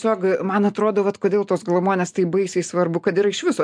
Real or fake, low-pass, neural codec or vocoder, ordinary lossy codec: real; 9.9 kHz; none; MP3, 64 kbps